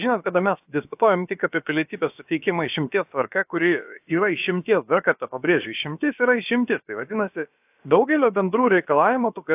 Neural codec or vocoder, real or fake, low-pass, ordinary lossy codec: codec, 16 kHz, about 1 kbps, DyCAST, with the encoder's durations; fake; 3.6 kHz; AAC, 32 kbps